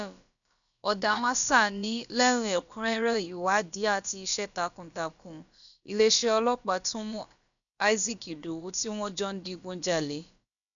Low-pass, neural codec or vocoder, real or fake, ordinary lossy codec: 7.2 kHz; codec, 16 kHz, about 1 kbps, DyCAST, with the encoder's durations; fake; none